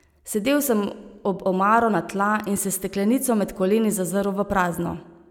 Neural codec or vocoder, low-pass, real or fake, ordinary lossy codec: none; 19.8 kHz; real; none